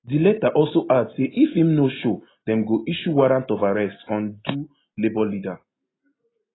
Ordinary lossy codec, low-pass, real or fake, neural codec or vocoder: AAC, 16 kbps; 7.2 kHz; real; none